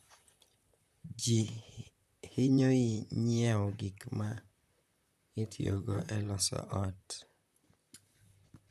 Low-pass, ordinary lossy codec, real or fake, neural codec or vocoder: 14.4 kHz; none; fake; vocoder, 44.1 kHz, 128 mel bands, Pupu-Vocoder